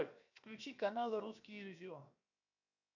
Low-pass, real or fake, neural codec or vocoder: 7.2 kHz; fake; codec, 16 kHz, 0.7 kbps, FocalCodec